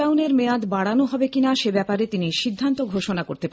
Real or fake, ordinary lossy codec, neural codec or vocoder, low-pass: real; none; none; none